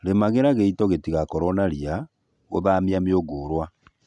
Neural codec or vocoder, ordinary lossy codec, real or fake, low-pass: none; none; real; 10.8 kHz